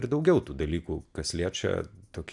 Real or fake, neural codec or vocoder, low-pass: real; none; 10.8 kHz